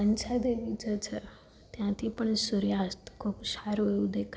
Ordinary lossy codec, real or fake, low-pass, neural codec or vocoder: none; real; none; none